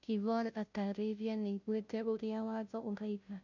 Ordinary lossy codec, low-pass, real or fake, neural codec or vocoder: MP3, 64 kbps; 7.2 kHz; fake; codec, 16 kHz, 0.5 kbps, FunCodec, trained on Chinese and English, 25 frames a second